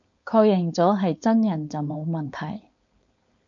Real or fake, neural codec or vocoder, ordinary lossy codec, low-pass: fake; codec, 16 kHz, 4.8 kbps, FACodec; MP3, 96 kbps; 7.2 kHz